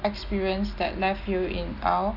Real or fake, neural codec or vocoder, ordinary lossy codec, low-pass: real; none; none; 5.4 kHz